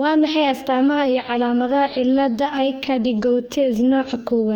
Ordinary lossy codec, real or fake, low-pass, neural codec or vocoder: none; fake; 19.8 kHz; codec, 44.1 kHz, 2.6 kbps, DAC